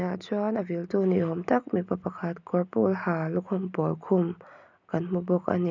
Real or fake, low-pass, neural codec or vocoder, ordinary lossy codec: real; 7.2 kHz; none; none